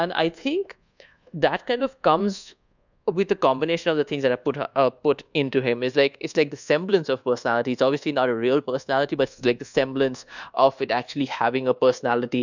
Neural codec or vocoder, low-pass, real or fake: codec, 24 kHz, 1.2 kbps, DualCodec; 7.2 kHz; fake